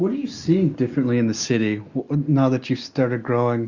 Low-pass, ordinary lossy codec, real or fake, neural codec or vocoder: 7.2 kHz; Opus, 64 kbps; real; none